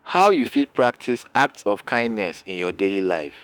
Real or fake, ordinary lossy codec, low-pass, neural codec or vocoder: fake; none; none; autoencoder, 48 kHz, 32 numbers a frame, DAC-VAE, trained on Japanese speech